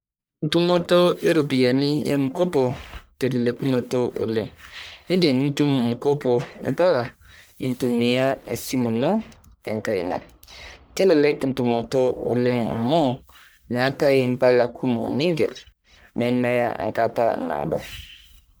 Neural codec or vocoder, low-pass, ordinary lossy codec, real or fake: codec, 44.1 kHz, 1.7 kbps, Pupu-Codec; none; none; fake